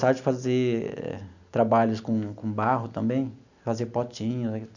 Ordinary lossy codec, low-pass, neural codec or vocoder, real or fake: none; 7.2 kHz; none; real